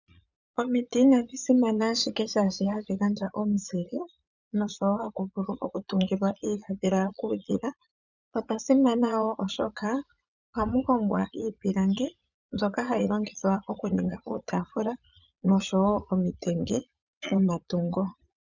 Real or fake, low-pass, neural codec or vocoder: fake; 7.2 kHz; vocoder, 22.05 kHz, 80 mel bands, Vocos